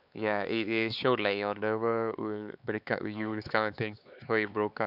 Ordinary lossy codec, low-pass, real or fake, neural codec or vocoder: none; 5.4 kHz; fake; codec, 16 kHz, 4 kbps, X-Codec, HuBERT features, trained on LibriSpeech